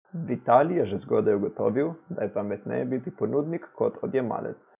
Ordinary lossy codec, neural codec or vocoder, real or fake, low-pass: none; none; real; 3.6 kHz